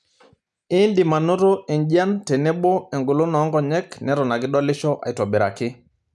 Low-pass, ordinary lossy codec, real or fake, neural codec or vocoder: none; none; real; none